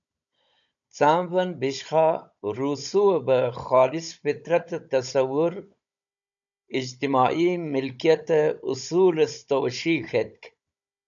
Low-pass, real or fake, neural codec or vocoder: 7.2 kHz; fake; codec, 16 kHz, 16 kbps, FunCodec, trained on Chinese and English, 50 frames a second